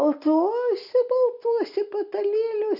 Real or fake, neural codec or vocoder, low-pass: real; none; 5.4 kHz